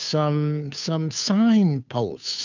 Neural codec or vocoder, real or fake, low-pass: codec, 16 kHz, 2 kbps, FunCodec, trained on Chinese and English, 25 frames a second; fake; 7.2 kHz